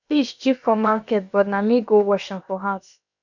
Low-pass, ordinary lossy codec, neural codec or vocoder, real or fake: 7.2 kHz; none; codec, 16 kHz, about 1 kbps, DyCAST, with the encoder's durations; fake